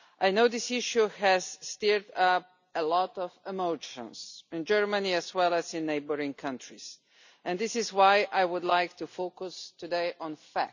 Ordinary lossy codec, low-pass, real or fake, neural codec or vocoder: none; 7.2 kHz; real; none